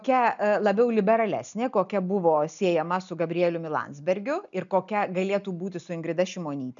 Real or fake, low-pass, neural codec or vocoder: real; 7.2 kHz; none